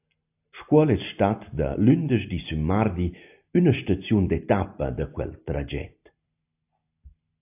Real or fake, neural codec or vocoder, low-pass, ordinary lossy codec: real; none; 3.6 kHz; AAC, 32 kbps